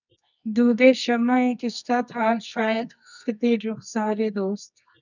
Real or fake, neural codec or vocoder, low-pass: fake; codec, 24 kHz, 0.9 kbps, WavTokenizer, medium music audio release; 7.2 kHz